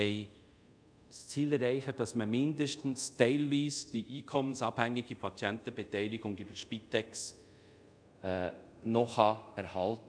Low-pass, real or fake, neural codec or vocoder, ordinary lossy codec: 9.9 kHz; fake; codec, 24 kHz, 0.5 kbps, DualCodec; none